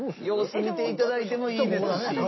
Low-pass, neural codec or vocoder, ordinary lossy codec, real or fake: 7.2 kHz; none; MP3, 24 kbps; real